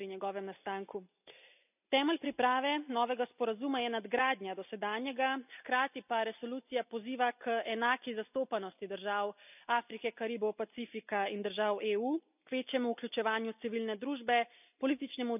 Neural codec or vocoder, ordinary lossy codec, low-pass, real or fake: none; none; 3.6 kHz; real